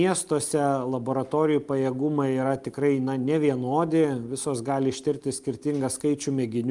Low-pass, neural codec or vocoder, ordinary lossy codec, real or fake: 10.8 kHz; none; Opus, 32 kbps; real